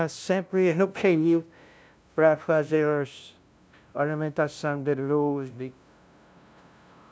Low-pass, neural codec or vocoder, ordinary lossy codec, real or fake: none; codec, 16 kHz, 0.5 kbps, FunCodec, trained on LibriTTS, 25 frames a second; none; fake